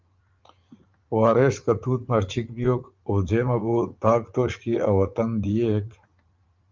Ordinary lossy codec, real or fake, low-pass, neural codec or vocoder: Opus, 24 kbps; fake; 7.2 kHz; vocoder, 44.1 kHz, 80 mel bands, Vocos